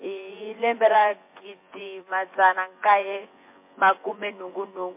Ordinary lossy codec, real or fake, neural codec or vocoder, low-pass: MP3, 24 kbps; fake; vocoder, 24 kHz, 100 mel bands, Vocos; 3.6 kHz